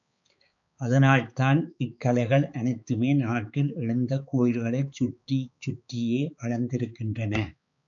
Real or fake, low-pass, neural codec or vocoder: fake; 7.2 kHz; codec, 16 kHz, 4 kbps, X-Codec, HuBERT features, trained on balanced general audio